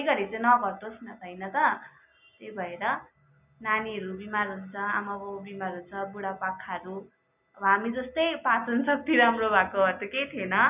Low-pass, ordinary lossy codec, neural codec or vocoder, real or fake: 3.6 kHz; none; none; real